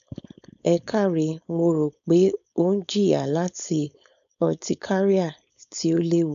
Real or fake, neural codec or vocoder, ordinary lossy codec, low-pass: fake; codec, 16 kHz, 4.8 kbps, FACodec; none; 7.2 kHz